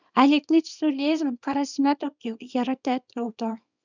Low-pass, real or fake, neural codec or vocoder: 7.2 kHz; fake; codec, 24 kHz, 0.9 kbps, WavTokenizer, small release